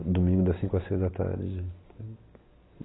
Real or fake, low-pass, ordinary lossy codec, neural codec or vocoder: real; 7.2 kHz; AAC, 16 kbps; none